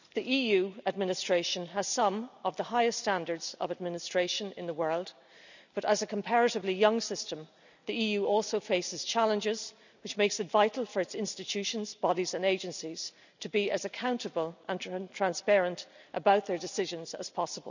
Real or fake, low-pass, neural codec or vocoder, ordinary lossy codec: real; 7.2 kHz; none; none